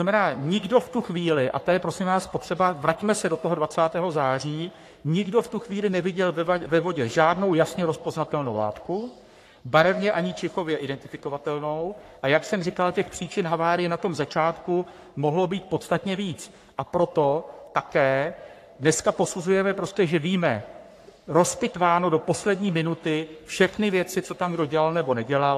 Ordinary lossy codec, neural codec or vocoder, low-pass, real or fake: AAC, 64 kbps; codec, 44.1 kHz, 3.4 kbps, Pupu-Codec; 14.4 kHz; fake